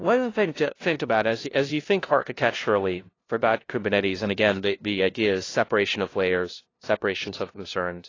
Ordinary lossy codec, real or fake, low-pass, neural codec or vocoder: AAC, 32 kbps; fake; 7.2 kHz; codec, 16 kHz, 0.5 kbps, FunCodec, trained on LibriTTS, 25 frames a second